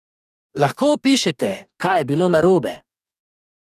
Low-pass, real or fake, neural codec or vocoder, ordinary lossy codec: 14.4 kHz; fake; codec, 44.1 kHz, 2.6 kbps, DAC; none